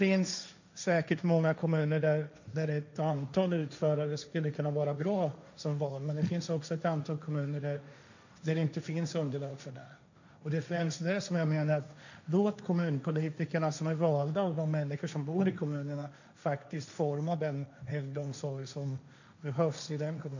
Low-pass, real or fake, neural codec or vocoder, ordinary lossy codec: 7.2 kHz; fake; codec, 16 kHz, 1.1 kbps, Voila-Tokenizer; none